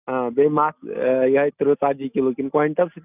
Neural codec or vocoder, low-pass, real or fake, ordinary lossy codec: none; 3.6 kHz; real; none